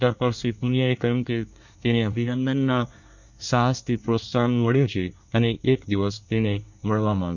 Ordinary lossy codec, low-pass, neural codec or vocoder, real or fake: none; 7.2 kHz; codec, 24 kHz, 1 kbps, SNAC; fake